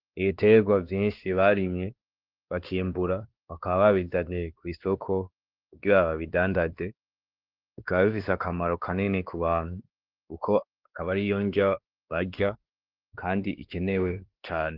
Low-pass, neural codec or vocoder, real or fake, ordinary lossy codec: 5.4 kHz; codec, 16 kHz, 2 kbps, X-Codec, WavLM features, trained on Multilingual LibriSpeech; fake; Opus, 16 kbps